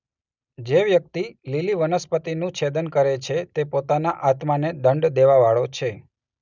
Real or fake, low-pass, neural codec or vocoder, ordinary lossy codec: real; 7.2 kHz; none; none